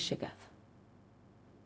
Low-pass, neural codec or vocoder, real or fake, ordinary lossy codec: none; codec, 16 kHz, 0.4 kbps, LongCat-Audio-Codec; fake; none